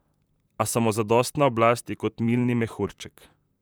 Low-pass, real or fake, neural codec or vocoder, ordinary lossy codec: none; fake; vocoder, 44.1 kHz, 128 mel bands, Pupu-Vocoder; none